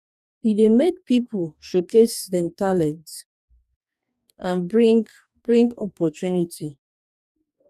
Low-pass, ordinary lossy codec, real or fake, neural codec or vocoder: 14.4 kHz; none; fake; codec, 44.1 kHz, 2.6 kbps, DAC